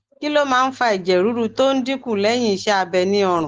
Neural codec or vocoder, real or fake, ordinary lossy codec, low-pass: none; real; Opus, 16 kbps; 7.2 kHz